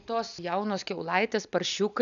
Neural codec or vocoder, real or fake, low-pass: none; real; 7.2 kHz